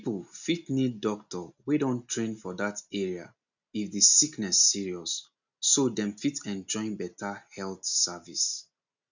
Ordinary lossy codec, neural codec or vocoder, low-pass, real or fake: none; none; 7.2 kHz; real